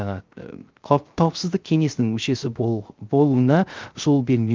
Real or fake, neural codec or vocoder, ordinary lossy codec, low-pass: fake; codec, 16 kHz, 0.3 kbps, FocalCodec; Opus, 32 kbps; 7.2 kHz